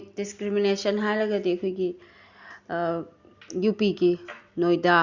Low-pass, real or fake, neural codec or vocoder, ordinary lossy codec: 7.2 kHz; real; none; Opus, 64 kbps